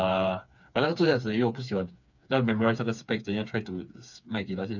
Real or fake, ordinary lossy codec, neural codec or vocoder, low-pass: fake; none; codec, 16 kHz, 4 kbps, FreqCodec, smaller model; 7.2 kHz